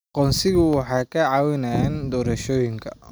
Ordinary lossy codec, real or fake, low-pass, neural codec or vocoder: none; real; none; none